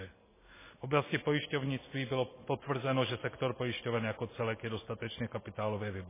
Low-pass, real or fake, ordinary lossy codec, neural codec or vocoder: 3.6 kHz; real; MP3, 16 kbps; none